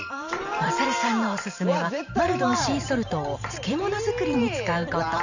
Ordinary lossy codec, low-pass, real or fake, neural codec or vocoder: none; 7.2 kHz; fake; vocoder, 44.1 kHz, 128 mel bands every 512 samples, BigVGAN v2